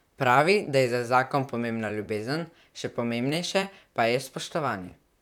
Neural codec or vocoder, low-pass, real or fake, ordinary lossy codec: vocoder, 44.1 kHz, 128 mel bands, Pupu-Vocoder; 19.8 kHz; fake; none